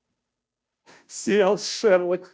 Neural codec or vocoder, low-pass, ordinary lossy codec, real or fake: codec, 16 kHz, 0.5 kbps, FunCodec, trained on Chinese and English, 25 frames a second; none; none; fake